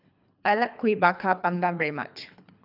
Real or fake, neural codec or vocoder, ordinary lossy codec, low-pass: fake; codec, 24 kHz, 3 kbps, HILCodec; none; 5.4 kHz